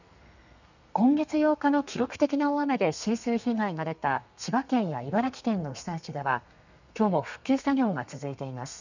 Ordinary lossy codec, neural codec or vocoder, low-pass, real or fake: none; codec, 32 kHz, 1.9 kbps, SNAC; 7.2 kHz; fake